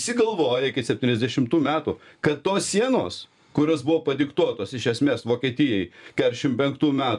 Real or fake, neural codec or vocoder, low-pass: fake; vocoder, 24 kHz, 100 mel bands, Vocos; 10.8 kHz